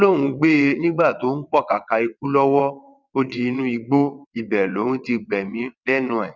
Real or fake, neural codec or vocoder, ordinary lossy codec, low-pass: fake; vocoder, 22.05 kHz, 80 mel bands, WaveNeXt; none; 7.2 kHz